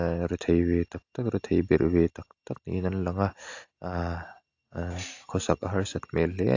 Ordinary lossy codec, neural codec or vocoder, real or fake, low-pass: AAC, 48 kbps; none; real; 7.2 kHz